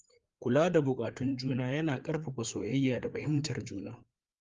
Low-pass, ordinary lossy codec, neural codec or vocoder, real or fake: 7.2 kHz; Opus, 32 kbps; codec, 16 kHz, 4 kbps, FreqCodec, larger model; fake